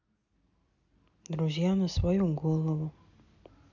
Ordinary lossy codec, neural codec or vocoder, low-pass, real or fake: none; none; 7.2 kHz; real